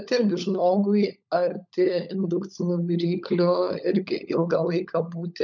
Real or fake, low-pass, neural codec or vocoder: fake; 7.2 kHz; codec, 16 kHz, 16 kbps, FunCodec, trained on LibriTTS, 50 frames a second